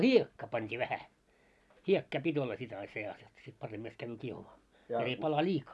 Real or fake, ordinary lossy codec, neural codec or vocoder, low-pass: real; none; none; none